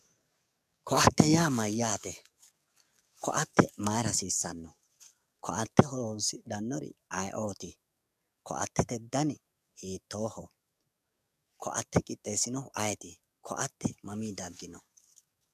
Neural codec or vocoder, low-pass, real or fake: codec, 44.1 kHz, 7.8 kbps, DAC; 14.4 kHz; fake